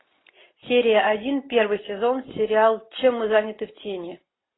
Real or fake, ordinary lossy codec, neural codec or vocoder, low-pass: real; AAC, 16 kbps; none; 7.2 kHz